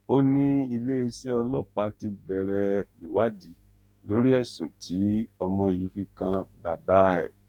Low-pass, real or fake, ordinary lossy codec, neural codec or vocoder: 19.8 kHz; fake; none; codec, 44.1 kHz, 2.6 kbps, DAC